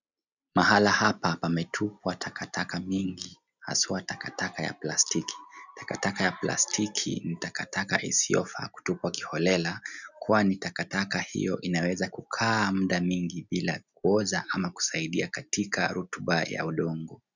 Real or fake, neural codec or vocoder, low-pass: real; none; 7.2 kHz